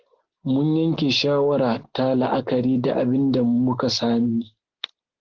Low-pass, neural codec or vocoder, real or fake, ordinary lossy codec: 7.2 kHz; none; real; Opus, 16 kbps